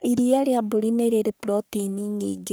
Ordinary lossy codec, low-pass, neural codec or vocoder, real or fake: none; none; codec, 44.1 kHz, 3.4 kbps, Pupu-Codec; fake